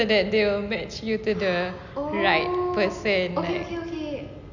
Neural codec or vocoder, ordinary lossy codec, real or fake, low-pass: none; none; real; 7.2 kHz